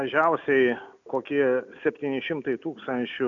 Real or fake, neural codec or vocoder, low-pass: real; none; 7.2 kHz